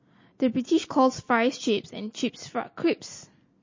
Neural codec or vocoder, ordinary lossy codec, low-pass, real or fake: none; MP3, 32 kbps; 7.2 kHz; real